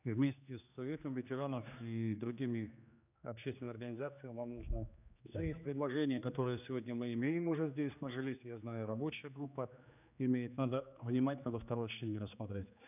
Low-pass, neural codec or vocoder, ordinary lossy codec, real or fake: 3.6 kHz; codec, 16 kHz, 2 kbps, X-Codec, HuBERT features, trained on general audio; none; fake